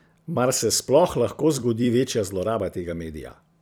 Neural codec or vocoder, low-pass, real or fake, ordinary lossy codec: vocoder, 44.1 kHz, 128 mel bands every 256 samples, BigVGAN v2; none; fake; none